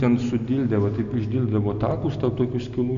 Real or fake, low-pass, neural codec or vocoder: real; 7.2 kHz; none